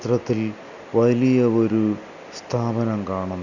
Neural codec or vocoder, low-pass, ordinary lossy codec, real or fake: none; 7.2 kHz; none; real